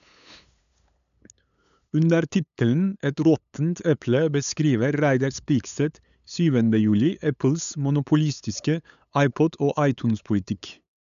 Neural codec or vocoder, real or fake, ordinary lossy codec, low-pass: codec, 16 kHz, 8 kbps, FunCodec, trained on LibriTTS, 25 frames a second; fake; none; 7.2 kHz